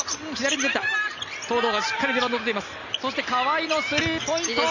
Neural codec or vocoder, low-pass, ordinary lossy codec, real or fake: none; 7.2 kHz; none; real